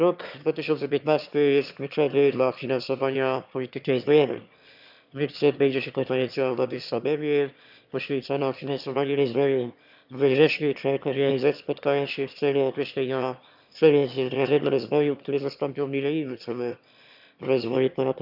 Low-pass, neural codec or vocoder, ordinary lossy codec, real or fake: 5.4 kHz; autoencoder, 22.05 kHz, a latent of 192 numbers a frame, VITS, trained on one speaker; none; fake